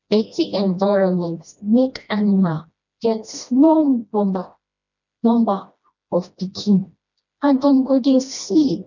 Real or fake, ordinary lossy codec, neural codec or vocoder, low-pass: fake; none; codec, 16 kHz, 1 kbps, FreqCodec, smaller model; 7.2 kHz